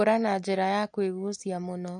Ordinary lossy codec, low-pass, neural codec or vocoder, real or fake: MP3, 48 kbps; 10.8 kHz; none; real